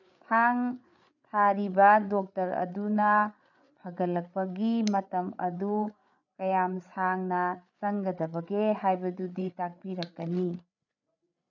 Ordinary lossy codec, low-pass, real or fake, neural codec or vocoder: none; 7.2 kHz; fake; codec, 16 kHz, 8 kbps, FreqCodec, larger model